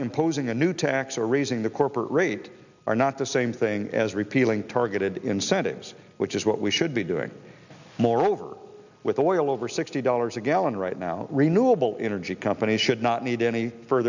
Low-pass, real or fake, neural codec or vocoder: 7.2 kHz; real; none